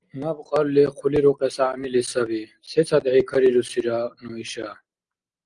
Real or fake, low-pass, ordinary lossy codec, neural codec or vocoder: real; 9.9 kHz; Opus, 24 kbps; none